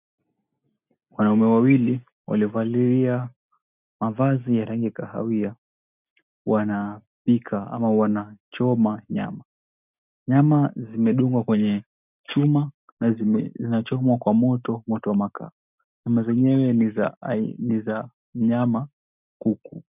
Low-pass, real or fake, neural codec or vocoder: 3.6 kHz; real; none